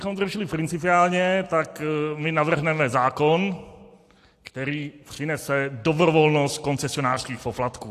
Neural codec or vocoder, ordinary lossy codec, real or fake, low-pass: none; AAC, 64 kbps; real; 14.4 kHz